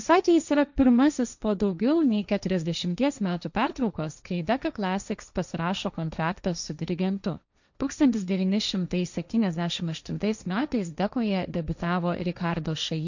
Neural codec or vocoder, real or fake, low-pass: codec, 16 kHz, 1.1 kbps, Voila-Tokenizer; fake; 7.2 kHz